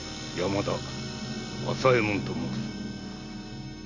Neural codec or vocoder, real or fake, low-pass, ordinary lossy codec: none; real; 7.2 kHz; none